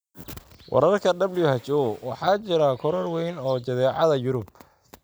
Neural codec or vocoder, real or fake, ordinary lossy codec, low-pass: none; real; none; none